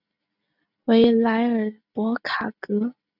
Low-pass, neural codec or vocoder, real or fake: 5.4 kHz; none; real